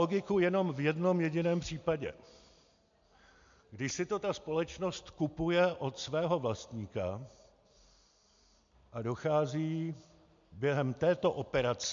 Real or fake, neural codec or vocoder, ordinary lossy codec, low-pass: real; none; MP3, 64 kbps; 7.2 kHz